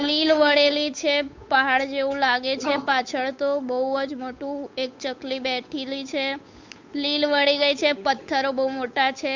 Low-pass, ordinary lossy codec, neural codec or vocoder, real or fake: 7.2 kHz; MP3, 64 kbps; codec, 16 kHz, 8 kbps, FunCodec, trained on Chinese and English, 25 frames a second; fake